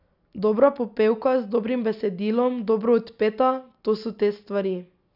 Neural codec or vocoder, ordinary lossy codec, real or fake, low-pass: none; none; real; 5.4 kHz